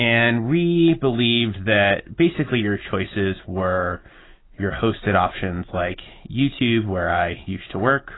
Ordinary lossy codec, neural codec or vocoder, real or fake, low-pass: AAC, 16 kbps; none; real; 7.2 kHz